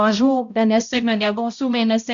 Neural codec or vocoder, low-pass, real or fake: codec, 16 kHz, 0.5 kbps, X-Codec, HuBERT features, trained on balanced general audio; 7.2 kHz; fake